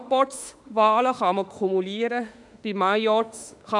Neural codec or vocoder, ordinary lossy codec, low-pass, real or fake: autoencoder, 48 kHz, 32 numbers a frame, DAC-VAE, trained on Japanese speech; none; 10.8 kHz; fake